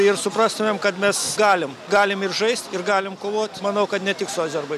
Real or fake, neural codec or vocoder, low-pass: real; none; 14.4 kHz